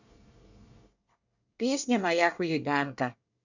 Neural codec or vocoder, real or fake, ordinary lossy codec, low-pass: codec, 24 kHz, 1 kbps, SNAC; fake; none; 7.2 kHz